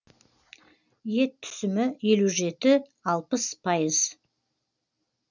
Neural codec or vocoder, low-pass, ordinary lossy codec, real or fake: none; 7.2 kHz; none; real